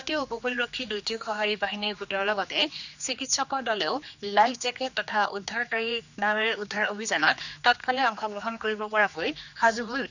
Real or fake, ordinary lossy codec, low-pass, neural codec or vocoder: fake; none; 7.2 kHz; codec, 16 kHz, 2 kbps, X-Codec, HuBERT features, trained on general audio